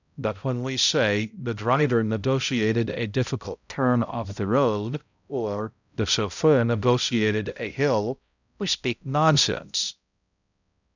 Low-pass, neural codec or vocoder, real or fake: 7.2 kHz; codec, 16 kHz, 0.5 kbps, X-Codec, HuBERT features, trained on balanced general audio; fake